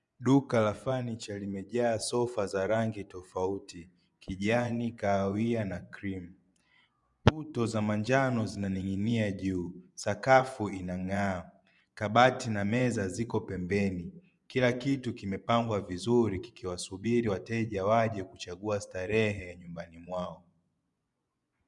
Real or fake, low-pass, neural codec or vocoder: fake; 10.8 kHz; vocoder, 24 kHz, 100 mel bands, Vocos